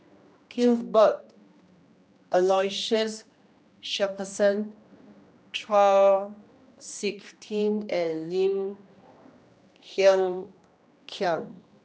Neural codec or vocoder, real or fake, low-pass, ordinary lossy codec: codec, 16 kHz, 1 kbps, X-Codec, HuBERT features, trained on general audio; fake; none; none